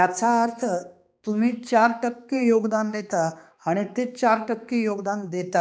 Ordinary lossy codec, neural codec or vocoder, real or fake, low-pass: none; codec, 16 kHz, 2 kbps, X-Codec, HuBERT features, trained on balanced general audio; fake; none